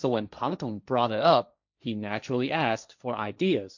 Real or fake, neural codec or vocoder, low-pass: fake; codec, 16 kHz, 1.1 kbps, Voila-Tokenizer; 7.2 kHz